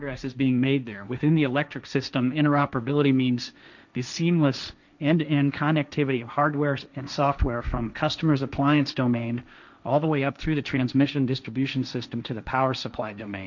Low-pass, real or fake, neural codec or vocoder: 7.2 kHz; fake; codec, 16 kHz, 1.1 kbps, Voila-Tokenizer